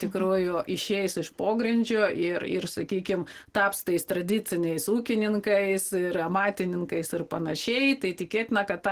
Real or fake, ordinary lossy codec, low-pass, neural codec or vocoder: real; Opus, 16 kbps; 14.4 kHz; none